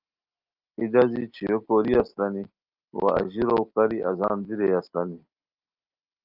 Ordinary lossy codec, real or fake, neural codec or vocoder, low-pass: Opus, 24 kbps; real; none; 5.4 kHz